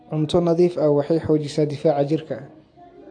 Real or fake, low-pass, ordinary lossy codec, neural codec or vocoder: real; 9.9 kHz; AAC, 48 kbps; none